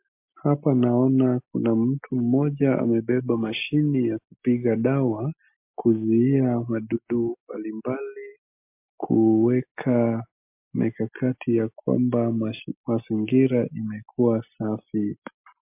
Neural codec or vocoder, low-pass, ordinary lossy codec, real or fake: none; 3.6 kHz; MP3, 32 kbps; real